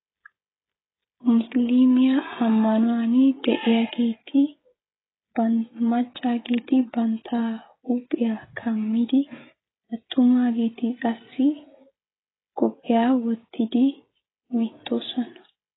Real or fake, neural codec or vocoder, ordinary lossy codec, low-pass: fake; codec, 16 kHz, 16 kbps, FreqCodec, smaller model; AAC, 16 kbps; 7.2 kHz